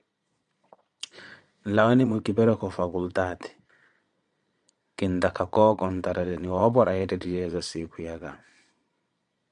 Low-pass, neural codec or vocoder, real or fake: 9.9 kHz; vocoder, 22.05 kHz, 80 mel bands, Vocos; fake